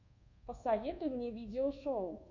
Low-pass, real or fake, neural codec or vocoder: 7.2 kHz; fake; codec, 24 kHz, 1.2 kbps, DualCodec